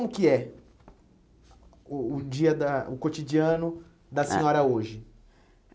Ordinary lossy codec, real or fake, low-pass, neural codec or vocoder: none; real; none; none